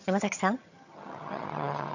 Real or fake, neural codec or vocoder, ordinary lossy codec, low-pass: fake; vocoder, 22.05 kHz, 80 mel bands, HiFi-GAN; none; 7.2 kHz